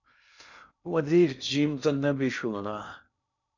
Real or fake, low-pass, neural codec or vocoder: fake; 7.2 kHz; codec, 16 kHz in and 24 kHz out, 0.6 kbps, FocalCodec, streaming, 2048 codes